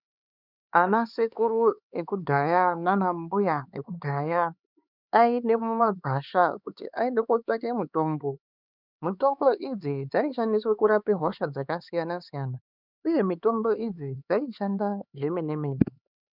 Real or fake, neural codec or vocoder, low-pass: fake; codec, 16 kHz, 4 kbps, X-Codec, HuBERT features, trained on LibriSpeech; 5.4 kHz